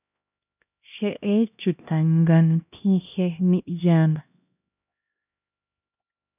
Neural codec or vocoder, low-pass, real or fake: codec, 16 kHz, 1 kbps, X-Codec, HuBERT features, trained on LibriSpeech; 3.6 kHz; fake